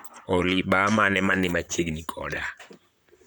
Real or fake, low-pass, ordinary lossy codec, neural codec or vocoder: fake; none; none; vocoder, 44.1 kHz, 128 mel bands, Pupu-Vocoder